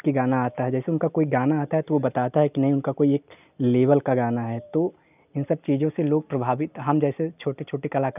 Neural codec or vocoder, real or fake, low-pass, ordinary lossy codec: none; real; 3.6 kHz; none